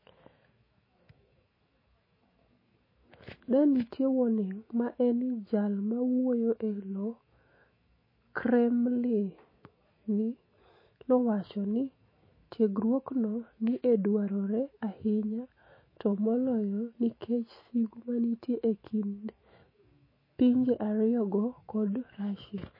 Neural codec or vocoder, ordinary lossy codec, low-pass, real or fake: autoencoder, 48 kHz, 128 numbers a frame, DAC-VAE, trained on Japanese speech; MP3, 24 kbps; 5.4 kHz; fake